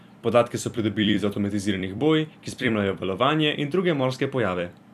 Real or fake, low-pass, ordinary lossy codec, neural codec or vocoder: fake; 14.4 kHz; none; vocoder, 44.1 kHz, 128 mel bands every 256 samples, BigVGAN v2